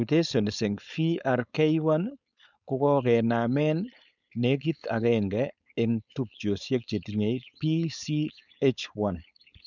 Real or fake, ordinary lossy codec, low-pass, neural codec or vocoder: fake; none; 7.2 kHz; codec, 16 kHz, 4.8 kbps, FACodec